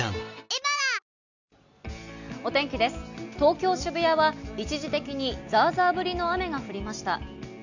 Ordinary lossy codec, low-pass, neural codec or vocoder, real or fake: none; 7.2 kHz; none; real